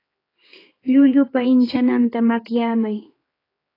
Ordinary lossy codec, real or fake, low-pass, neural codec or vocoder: AAC, 24 kbps; fake; 5.4 kHz; codec, 16 kHz, 4 kbps, X-Codec, HuBERT features, trained on general audio